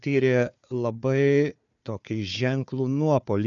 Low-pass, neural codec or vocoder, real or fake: 7.2 kHz; codec, 16 kHz, 4 kbps, FunCodec, trained on Chinese and English, 50 frames a second; fake